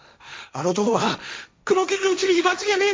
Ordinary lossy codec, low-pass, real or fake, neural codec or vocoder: none; none; fake; codec, 16 kHz, 1.1 kbps, Voila-Tokenizer